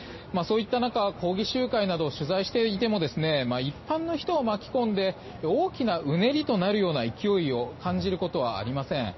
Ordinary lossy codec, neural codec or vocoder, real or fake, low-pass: MP3, 24 kbps; none; real; 7.2 kHz